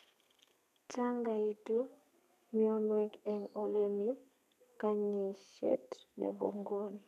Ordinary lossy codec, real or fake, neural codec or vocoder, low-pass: none; fake; codec, 32 kHz, 1.9 kbps, SNAC; 14.4 kHz